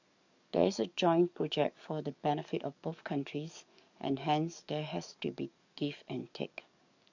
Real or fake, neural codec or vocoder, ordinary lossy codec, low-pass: fake; codec, 44.1 kHz, 7.8 kbps, DAC; none; 7.2 kHz